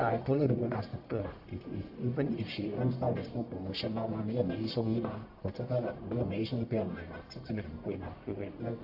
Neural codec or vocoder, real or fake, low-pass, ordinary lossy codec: codec, 44.1 kHz, 1.7 kbps, Pupu-Codec; fake; 5.4 kHz; AAC, 48 kbps